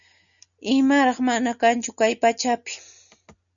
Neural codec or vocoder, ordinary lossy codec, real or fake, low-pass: none; MP3, 96 kbps; real; 7.2 kHz